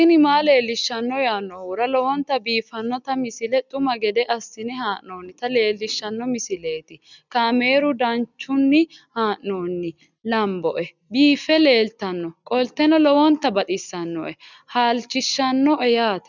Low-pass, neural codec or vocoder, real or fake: 7.2 kHz; none; real